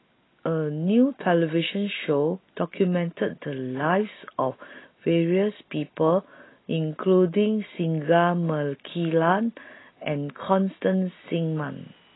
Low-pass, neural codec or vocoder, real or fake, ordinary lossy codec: 7.2 kHz; none; real; AAC, 16 kbps